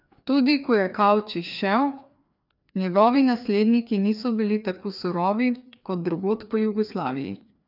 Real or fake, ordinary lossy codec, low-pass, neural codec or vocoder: fake; none; 5.4 kHz; codec, 16 kHz, 2 kbps, FreqCodec, larger model